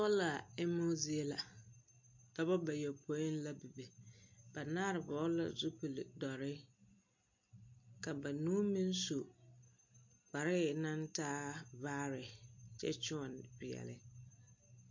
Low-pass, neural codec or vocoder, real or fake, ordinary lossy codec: 7.2 kHz; none; real; MP3, 48 kbps